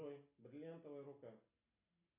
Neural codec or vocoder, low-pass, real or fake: none; 3.6 kHz; real